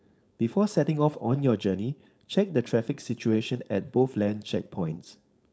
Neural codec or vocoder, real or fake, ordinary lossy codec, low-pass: codec, 16 kHz, 4.8 kbps, FACodec; fake; none; none